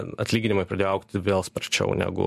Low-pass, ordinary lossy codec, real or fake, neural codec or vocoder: 14.4 kHz; MP3, 64 kbps; real; none